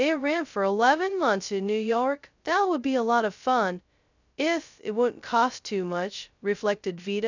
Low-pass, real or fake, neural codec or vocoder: 7.2 kHz; fake; codec, 16 kHz, 0.2 kbps, FocalCodec